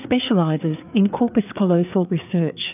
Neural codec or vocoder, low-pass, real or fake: codec, 16 kHz, 4 kbps, FreqCodec, larger model; 3.6 kHz; fake